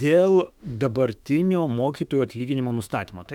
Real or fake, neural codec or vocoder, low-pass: fake; autoencoder, 48 kHz, 32 numbers a frame, DAC-VAE, trained on Japanese speech; 19.8 kHz